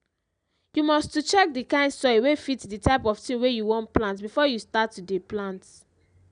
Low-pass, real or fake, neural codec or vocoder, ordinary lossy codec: 9.9 kHz; real; none; none